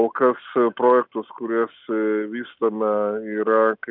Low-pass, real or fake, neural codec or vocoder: 5.4 kHz; real; none